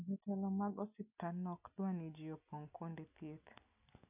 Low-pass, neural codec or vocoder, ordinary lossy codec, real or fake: 3.6 kHz; none; none; real